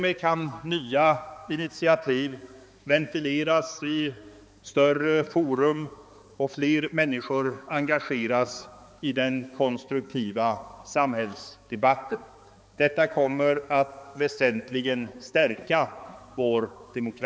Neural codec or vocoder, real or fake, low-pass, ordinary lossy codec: codec, 16 kHz, 4 kbps, X-Codec, HuBERT features, trained on balanced general audio; fake; none; none